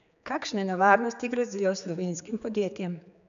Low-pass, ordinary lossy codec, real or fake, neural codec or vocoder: 7.2 kHz; none; fake; codec, 16 kHz, 4 kbps, X-Codec, HuBERT features, trained on general audio